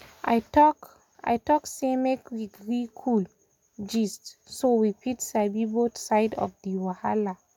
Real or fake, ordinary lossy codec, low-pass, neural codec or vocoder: real; none; 19.8 kHz; none